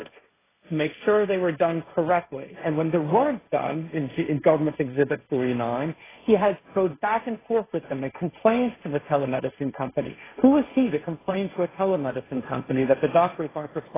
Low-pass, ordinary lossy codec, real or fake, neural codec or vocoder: 3.6 kHz; AAC, 16 kbps; fake; codec, 16 kHz, 1.1 kbps, Voila-Tokenizer